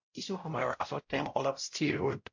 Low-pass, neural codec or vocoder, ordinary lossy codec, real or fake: 7.2 kHz; codec, 16 kHz, 0.5 kbps, X-Codec, WavLM features, trained on Multilingual LibriSpeech; MP3, 48 kbps; fake